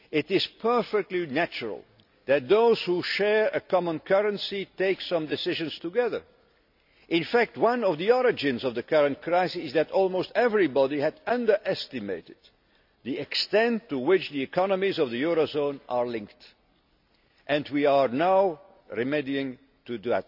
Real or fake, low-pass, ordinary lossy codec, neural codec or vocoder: real; 5.4 kHz; none; none